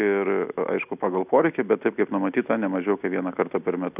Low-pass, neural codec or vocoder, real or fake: 3.6 kHz; none; real